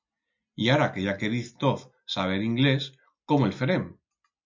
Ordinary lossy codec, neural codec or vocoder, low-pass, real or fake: MP3, 64 kbps; none; 7.2 kHz; real